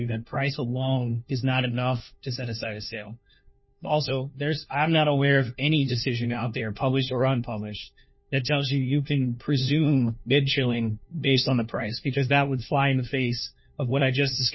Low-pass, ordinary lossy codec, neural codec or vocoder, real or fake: 7.2 kHz; MP3, 24 kbps; codec, 16 kHz, 1 kbps, FunCodec, trained on LibriTTS, 50 frames a second; fake